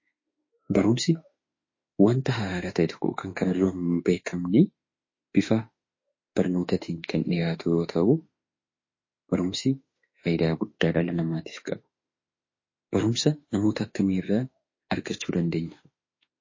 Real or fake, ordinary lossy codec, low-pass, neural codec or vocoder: fake; MP3, 32 kbps; 7.2 kHz; autoencoder, 48 kHz, 32 numbers a frame, DAC-VAE, trained on Japanese speech